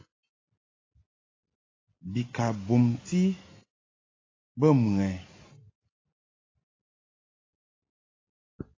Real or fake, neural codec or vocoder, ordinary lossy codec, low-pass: real; none; AAC, 32 kbps; 7.2 kHz